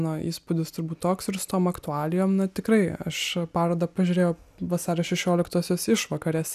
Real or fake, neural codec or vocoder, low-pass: real; none; 14.4 kHz